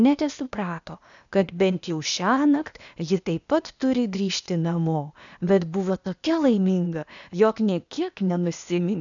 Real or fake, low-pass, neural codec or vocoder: fake; 7.2 kHz; codec, 16 kHz, 0.8 kbps, ZipCodec